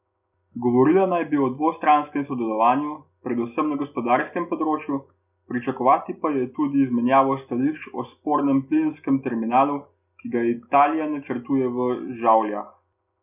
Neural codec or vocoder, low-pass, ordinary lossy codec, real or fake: none; 3.6 kHz; none; real